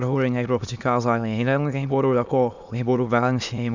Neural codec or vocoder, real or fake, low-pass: autoencoder, 22.05 kHz, a latent of 192 numbers a frame, VITS, trained on many speakers; fake; 7.2 kHz